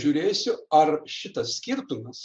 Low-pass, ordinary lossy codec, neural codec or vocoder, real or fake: 9.9 kHz; MP3, 96 kbps; none; real